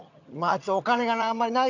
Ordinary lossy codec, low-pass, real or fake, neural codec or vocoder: none; 7.2 kHz; fake; vocoder, 22.05 kHz, 80 mel bands, HiFi-GAN